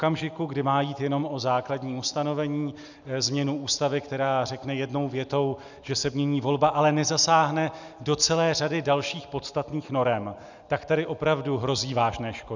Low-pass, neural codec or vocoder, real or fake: 7.2 kHz; none; real